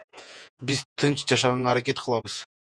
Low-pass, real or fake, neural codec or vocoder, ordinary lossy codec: 9.9 kHz; fake; vocoder, 48 kHz, 128 mel bands, Vocos; Opus, 64 kbps